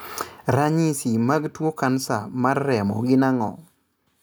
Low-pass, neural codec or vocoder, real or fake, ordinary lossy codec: none; none; real; none